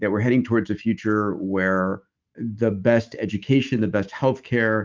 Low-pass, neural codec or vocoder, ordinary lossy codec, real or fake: 7.2 kHz; autoencoder, 48 kHz, 128 numbers a frame, DAC-VAE, trained on Japanese speech; Opus, 32 kbps; fake